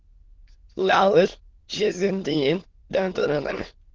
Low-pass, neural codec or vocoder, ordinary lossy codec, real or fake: 7.2 kHz; autoencoder, 22.05 kHz, a latent of 192 numbers a frame, VITS, trained on many speakers; Opus, 16 kbps; fake